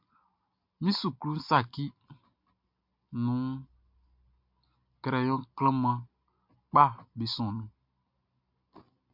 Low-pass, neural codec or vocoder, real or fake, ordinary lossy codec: 5.4 kHz; none; real; MP3, 48 kbps